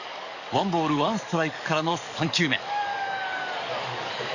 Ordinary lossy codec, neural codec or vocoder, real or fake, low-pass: none; codec, 44.1 kHz, 7.8 kbps, DAC; fake; 7.2 kHz